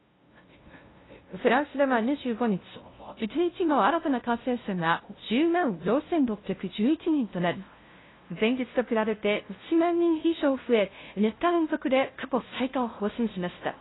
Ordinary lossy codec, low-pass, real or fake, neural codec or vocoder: AAC, 16 kbps; 7.2 kHz; fake; codec, 16 kHz, 0.5 kbps, FunCodec, trained on LibriTTS, 25 frames a second